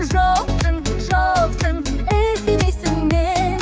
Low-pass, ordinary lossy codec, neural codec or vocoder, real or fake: none; none; codec, 16 kHz, 4 kbps, X-Codec, HuBERT features, trained on general audio; fake